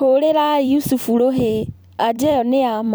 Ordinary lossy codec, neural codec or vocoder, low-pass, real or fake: none; vocoder, 44.1 kHz, 128 mel bands every 256 samples, BigVGAN v2; none; fake